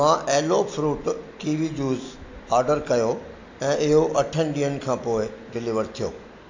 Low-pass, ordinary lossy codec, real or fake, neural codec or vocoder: 7.2 kHz; AAC, 48 kbps; real; none